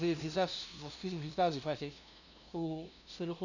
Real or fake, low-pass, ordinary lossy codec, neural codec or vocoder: fake; 7.2 kHz; none; codec, 16 kHz, 0.5 kbps, FunCodec, trained on LibriTTS, 25 frames a second